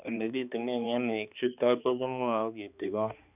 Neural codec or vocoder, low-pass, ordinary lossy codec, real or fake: codec, 16 kHz, 2 kbps, X-Codec, HuBERT features, trained on balanced general audio; 3.6 kHz; none; fake